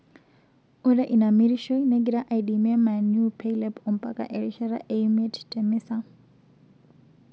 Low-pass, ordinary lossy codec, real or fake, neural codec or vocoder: none; none; real; none